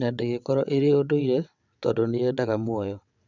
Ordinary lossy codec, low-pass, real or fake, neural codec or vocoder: none; 7.2 kHz; fake; vocoder, 22.05 kHz, 80 mel bands, WaveNeXt